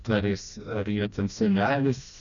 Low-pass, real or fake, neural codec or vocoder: 7.2 kHz; fake; codec, 16 kHz, 1 kbps, FreqCodec, smaller model